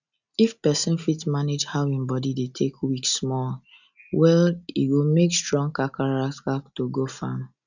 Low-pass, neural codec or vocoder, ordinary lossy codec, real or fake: 7.2 kHz; none; none; real